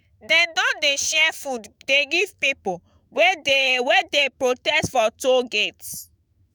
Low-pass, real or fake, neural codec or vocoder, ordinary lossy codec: none; fake; autoencoder, 48 kHz, 128 numbers a frame, DAC-VAE, trained on Japanese speech; none